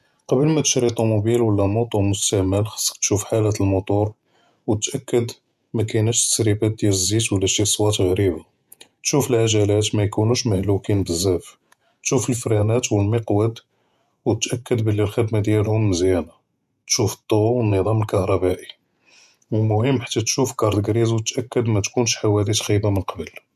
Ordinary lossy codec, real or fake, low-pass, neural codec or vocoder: none; fake; 14.4 kHz; vocoder, 48 kHz, 128 mel bands, Vocos